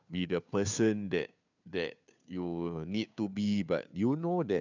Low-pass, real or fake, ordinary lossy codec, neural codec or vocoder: 7.2 kHz; fake; none; codec, 16 kHz, 2 kbps, FunCodec, trained on Chinese and English, 25 frames a second